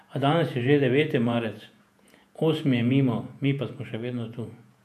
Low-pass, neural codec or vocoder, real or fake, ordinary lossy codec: 14.4 kHz; vocoder, 48 kHz, 128 mel bands, Vocos; fake; none